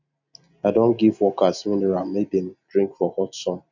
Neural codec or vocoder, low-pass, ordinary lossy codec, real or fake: none; 7.2 kHz; none; real